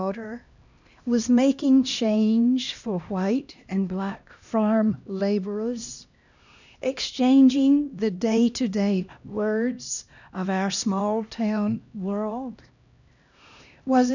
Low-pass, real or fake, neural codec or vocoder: 7.2 kHz; fake; codec, 16 kHz, 1 kbps, X-Codec, HuBERT features, trained on LibriSpeech